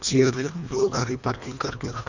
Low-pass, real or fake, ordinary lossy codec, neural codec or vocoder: 7.2 kHz; fake; none; codec, 24 kHz, 1.5 kbps, HILCodec